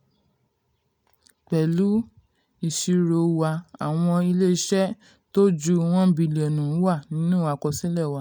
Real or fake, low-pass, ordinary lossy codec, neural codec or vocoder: real; none; none; none